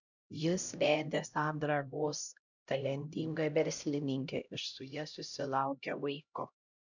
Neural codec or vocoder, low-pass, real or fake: codec, 16 kHz, 0.5 kbps, X-Codec, HuBERT features, trained on LibriSpeech; 7.2 kHz; fake